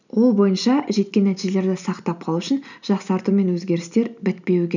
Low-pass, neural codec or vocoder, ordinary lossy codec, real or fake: 7.2 kHz; none; none; real